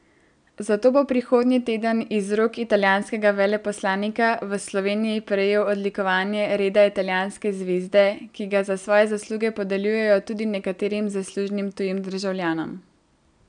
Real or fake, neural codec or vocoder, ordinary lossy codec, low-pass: real; none; none; 9.9 kHz